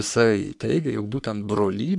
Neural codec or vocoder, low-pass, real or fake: codec, 44.1 kHz, 3.4 kbps, Pupu-Codec; 10.8 kHz; fake